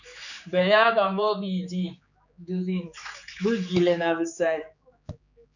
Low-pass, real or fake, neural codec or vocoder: 7.2 kHz; fake; codec, 16 kHz, 4 kbps, X-Codec, HuBERT features, trained on general audio